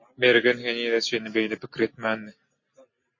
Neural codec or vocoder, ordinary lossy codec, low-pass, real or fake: none; MP3, 32 kbps; 7.2 kHz; real